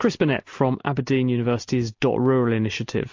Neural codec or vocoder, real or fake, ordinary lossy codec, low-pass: none; real; MP3, 48 kbps; 7.2 kHz